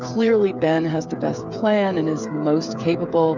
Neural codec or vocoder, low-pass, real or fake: codec, 16 kHz, 8 kbps, FreqCodec, smaller model; 7.2 kHz; fake